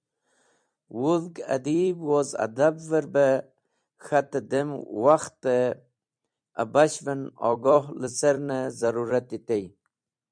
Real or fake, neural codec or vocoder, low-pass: fake; vocoder, 44.1 kHz, 128 mel bands every 256 samples, BigVGAN v2; 9.9 kHz